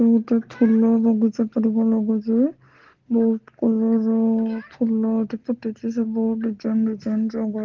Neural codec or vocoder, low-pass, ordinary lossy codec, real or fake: none; 7.2 kHz; Opus, 16 kbps; real